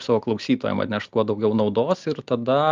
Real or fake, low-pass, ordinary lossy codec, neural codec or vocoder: real; 7.2 kHz; Opus, 32 kbps; none